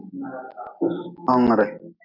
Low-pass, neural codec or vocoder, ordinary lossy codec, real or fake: 5.4 kHz; none; MP3, 48 kbps; real